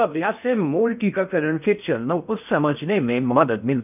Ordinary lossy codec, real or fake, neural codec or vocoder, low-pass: none; fake; codec, 16 kHz in and 24 kHz out, 0.6 kbps, FocalCodec, streaming, 2048 codes; 3.6 kHz